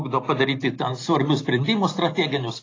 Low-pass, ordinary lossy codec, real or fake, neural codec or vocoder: 7.2 kHz; AAC, 32 kbps; real; none